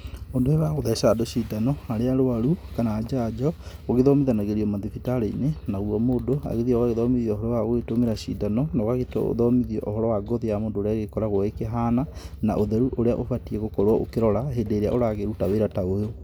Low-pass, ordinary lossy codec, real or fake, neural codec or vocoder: none; none; real; none